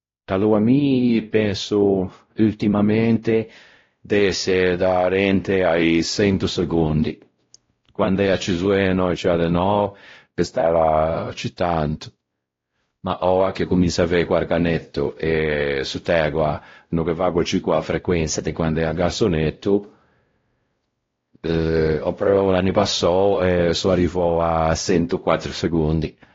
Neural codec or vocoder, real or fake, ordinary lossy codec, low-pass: codec, 16 kHz, 0.5 kbps, X-Codec, WavLM features, trained on Multilingual LibriSpeech; fake; AAC, 32 kbps; 7.2 kHz